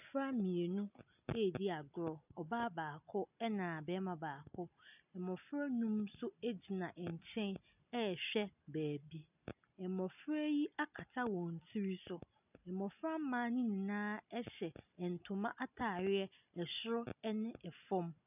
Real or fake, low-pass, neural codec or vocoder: real; 3.6 kHz; none